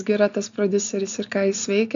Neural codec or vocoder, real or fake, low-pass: none; real; 7.2 kHz